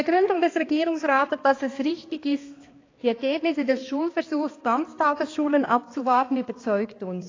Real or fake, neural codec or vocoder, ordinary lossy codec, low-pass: fake; codec, 16 kHz, 2 kbps, X-Codec, HuBERT features, trained on balanced general audio; AAC, 32 kbps; 7.2 kHz